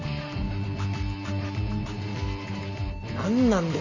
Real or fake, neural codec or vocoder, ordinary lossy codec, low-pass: real; none; none; 7.2 kHz